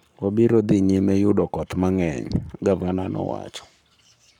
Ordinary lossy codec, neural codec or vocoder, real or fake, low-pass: none; codec, 44.1 kHz, 7.8 kbps, Pupu-Codec; fake; 19.8 kHz